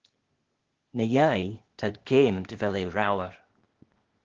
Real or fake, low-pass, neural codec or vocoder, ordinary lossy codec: fake; 7.2 kHz; codec, 16 kHz, 0.8 kbps, ZipCodec; Opus, 16 kbps